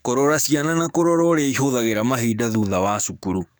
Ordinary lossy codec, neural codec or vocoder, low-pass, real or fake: none; codec, 44.1 kHz, 7.8 kbps, DAC; none; fake